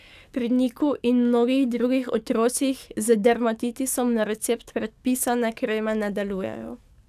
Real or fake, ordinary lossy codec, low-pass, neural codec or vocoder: fake; none; 14.4 kHz; codec, 44.1 kHz, 7.8 kbps, DAC